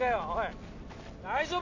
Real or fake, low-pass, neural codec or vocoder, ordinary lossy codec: real; 7.2 kHz; none; AAC, 48 kbps